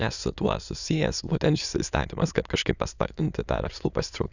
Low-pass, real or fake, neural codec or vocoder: 7.2 kHz; fake; autoencoder, 22.05 kHz, a latent of 192 numbers a frame, VITS, trained on many speakers